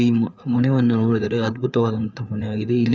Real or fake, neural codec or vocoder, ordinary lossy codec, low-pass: fake; codec, 16 kHz, 4 kbps, FreqCodec, larger model; none; none